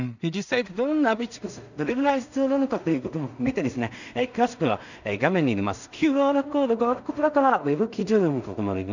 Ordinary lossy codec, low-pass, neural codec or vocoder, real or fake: none; 7.2 kHz; codec, 16 kHz in and 24 kHz out, 0.4 kbps, LongCat-Audio-Codec, two codebook decoder; fake